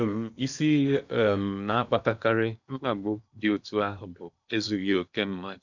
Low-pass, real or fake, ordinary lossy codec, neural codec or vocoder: 7.2 kHz; fake; none; codec, 16 kHz in and 24 kHz out, 0.8 kbps, FocalCodec, streaming, 65536 codes